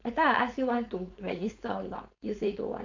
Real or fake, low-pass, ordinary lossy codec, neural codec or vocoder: fake; 7.2 kHz; MP3, 64 kbps; codec, 16 kHz, 4.8 kbps, FACodec